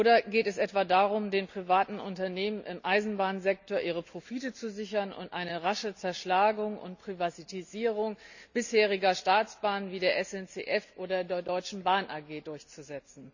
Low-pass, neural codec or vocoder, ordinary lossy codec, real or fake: 7.2 kHz; none; none; real